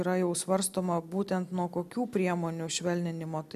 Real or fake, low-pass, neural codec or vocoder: real; 14.4 kHz; none